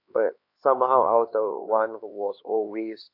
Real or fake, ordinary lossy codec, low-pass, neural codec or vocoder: fake; AAC, 32 kbps; 5.4 kHz; codec, 16 kHz, 2 kbps, X-Codec, HuBERT features, trained on LibriSpeech